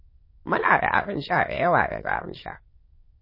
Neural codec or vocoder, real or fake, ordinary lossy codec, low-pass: autoencoder, 22.05 kHz, a latent of 192 numbers a frame, VITS, trained on many speakers; fake; MP3, 24 kbps; 5.4 kHz